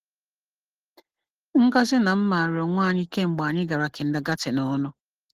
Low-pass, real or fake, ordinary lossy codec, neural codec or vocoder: 14.4 kHz; real; Opus, 16 kbps; none